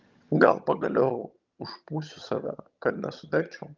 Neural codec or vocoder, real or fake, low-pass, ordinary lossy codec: vocoder, 22.05 kHz, 80 mel bands, HiFi-GAN; fake; 7.2 kHz; Opus, 32 kbps